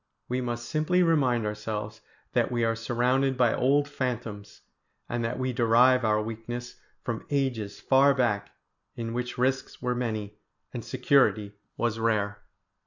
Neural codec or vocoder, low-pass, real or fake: none; 7.2 kHz; real